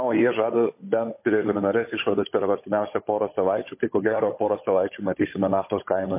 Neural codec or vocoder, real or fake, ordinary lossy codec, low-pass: codec, 16 kHz, 16 kbps, FunCodec, trained on Chinese and English, 50 frames a second; fake; MP3, 24 kbps; 3.6 kHz